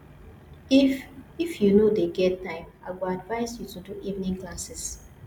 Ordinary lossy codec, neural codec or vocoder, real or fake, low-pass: none; none; real; 19.8 kHz